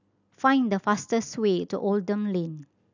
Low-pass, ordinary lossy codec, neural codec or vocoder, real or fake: 7.2 kHz; none; none; real